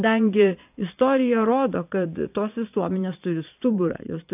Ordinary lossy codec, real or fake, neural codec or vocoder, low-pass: AAC, 32 kbps; fake; vocoder, 22.05 kHz, 80 mel bands, Vocos; 3.6 kHz